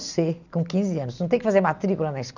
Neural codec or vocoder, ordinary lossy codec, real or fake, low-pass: none; none; real; 7.2 kHz